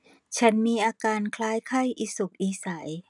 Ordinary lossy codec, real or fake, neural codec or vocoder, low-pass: none; real; none; 10.8 kHz